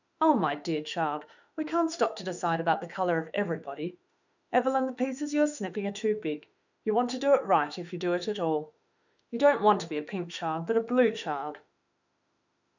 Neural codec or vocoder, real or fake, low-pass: autoencoder, 48 kHz, 32 numbers a frame, DAC-VAE, trained on Japanese speech; fake; 7.2 kHz